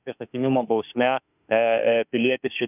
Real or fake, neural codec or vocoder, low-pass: fake; autoencoder, 48 kHz, 32 numbers a frame, DAC-VAE, trained on Japanese speech; 3.6 kHz